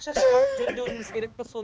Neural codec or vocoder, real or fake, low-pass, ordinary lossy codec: codec, 16 kHz, 2 kbps, X-Codec, HuBERT features, trained on balanced general audio; fake; none; none